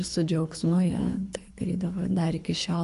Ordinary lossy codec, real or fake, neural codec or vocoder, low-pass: AAC, 96 kbps; fake; codec, 24 kHz, 3 kbps, HILCodec; 10.8 kHz